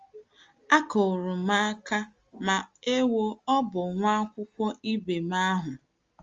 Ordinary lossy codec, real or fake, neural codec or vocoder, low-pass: Opus, 32 kbps; real; none; 7.2 kHz